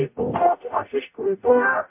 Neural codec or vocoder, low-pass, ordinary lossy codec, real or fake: codec, 44.1 kHz, 0.9 kbps, DAC; 3.6 kHz; MP3, 32 kbps; fake